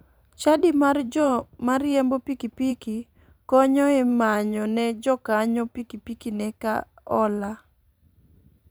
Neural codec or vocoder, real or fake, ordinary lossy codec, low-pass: vocoder, 44.1 kHz, 128 mel bands every 512 samples, BigVGAN v2; fake; none; none